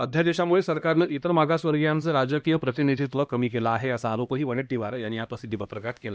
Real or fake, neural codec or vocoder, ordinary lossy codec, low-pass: fake; codec, 16 kHz, 1 kbps, X-Codec, HuBERT features, trained on LibriSpeech; none; none